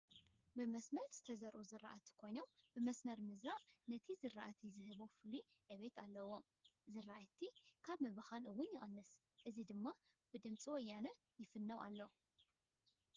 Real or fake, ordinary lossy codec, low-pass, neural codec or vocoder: fake; Opus, 24 kbps; 7.2 kHz; codec, 16 kHz, 4 kbps, FreqCodec, smaller model